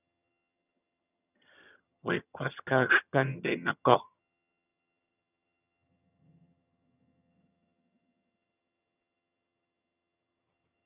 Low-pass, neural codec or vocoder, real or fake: 3.6 kHz; vocoder, 22.05 kHz, 80 mel bands, HiFi-GAN; fake